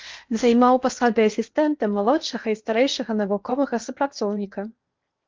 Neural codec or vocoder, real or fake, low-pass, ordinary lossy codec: codec, 16 kHz in and 24 kHz out, 0.8 kbps, FocalCodec, streaming, 65536 codes; fake; 7.2 kHz; Opus, 32 kbps